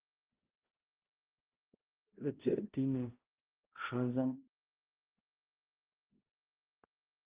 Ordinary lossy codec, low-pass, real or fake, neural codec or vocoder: Opus, 32 kbps; 3.6 kHz; fake; codec, 16 kHz, 0.5 kbps, X-Codec, HuBERT features, trained on balanced general audio